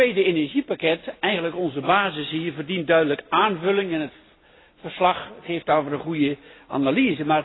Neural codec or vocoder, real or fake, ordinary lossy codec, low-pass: none; real; AAC, 16 kbps; 7.2 kHz